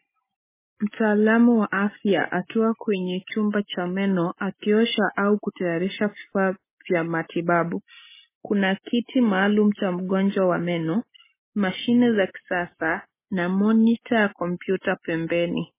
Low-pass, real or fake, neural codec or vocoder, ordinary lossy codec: 3.6 kHz; real; none; MP3, 16 kbps